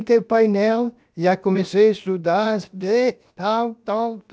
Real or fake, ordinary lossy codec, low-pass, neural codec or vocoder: fake; none; none; codec, 16 kHz, 0.7 kbps, FocalCodec